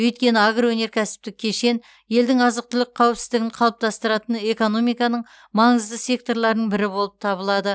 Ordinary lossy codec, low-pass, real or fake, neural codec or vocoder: none; none; real; none